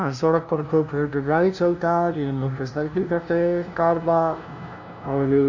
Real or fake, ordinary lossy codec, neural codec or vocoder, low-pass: fake; none; codec, 16 kHz, 0.5 kbps, FunCodec, trained on LibriTTS, 25 frames a second; 7.2 kHz